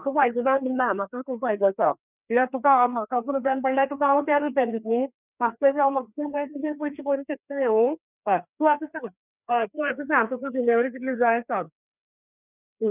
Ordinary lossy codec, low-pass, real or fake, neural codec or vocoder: none; 3.6 kHz; fake; codec, 16 kHz, 2 kbps, FreqCodec, larger model